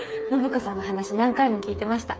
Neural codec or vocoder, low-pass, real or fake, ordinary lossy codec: codec, 16 kHz, 4 kbps, FreqCodec, smaller model; none; fake; none